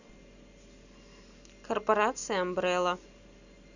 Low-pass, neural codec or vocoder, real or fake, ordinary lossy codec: 7.2 kHz; none; real; none